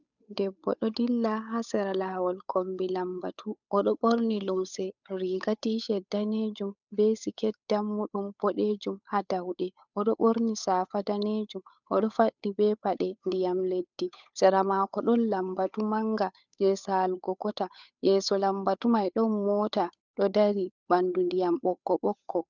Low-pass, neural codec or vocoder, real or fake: 7.2 kHz; codec, 16 kHz, 8 kbps, FunCodec, trained on Chinese and English, 25 frames a second; fake